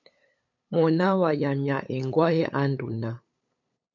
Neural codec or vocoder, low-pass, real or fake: codec, 16 kHz, 8 kbps, FunCodec, trained on LibriTTS, 25 frames a second; 7.2 kHz; fake